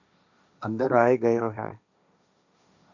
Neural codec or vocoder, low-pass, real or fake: codec, 16 kHz, 1.1 kbps, Voila-Tokenizer; 7.2 kHz; fake